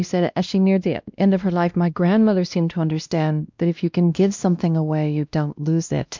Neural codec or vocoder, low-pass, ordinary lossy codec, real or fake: codec, 16 kHz, 1 kbps, X-Codec, WavLM features, trained on Multilingual LibriSpeech; 7.2 kHz; MP3, 64 kbps; fake